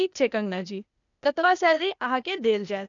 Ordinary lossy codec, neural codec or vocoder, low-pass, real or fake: none; codec, 16 kHz, 0.8 kbps, ZipCodec; 7.2 kHz; fake